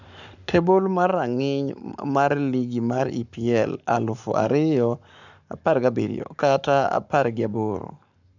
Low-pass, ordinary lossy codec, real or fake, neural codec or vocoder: 7.2 kHz; none; fake; codec, 44.1 kHz, 7.8 kbps, Pupu-Codec